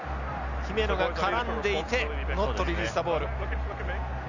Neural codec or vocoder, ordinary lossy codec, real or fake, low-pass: none; none; real; 7.2 kHz